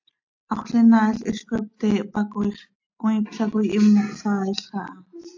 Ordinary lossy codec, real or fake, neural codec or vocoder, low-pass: AAC, 48 kbps; real; none; 7.2 kHz